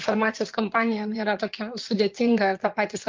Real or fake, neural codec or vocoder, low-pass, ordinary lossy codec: fake; codec, 16 kHz in and 24 kHz out, 2.2 kbps, FireRedTTS-2 codec; 7.2 kHz; Opus, 24 kbps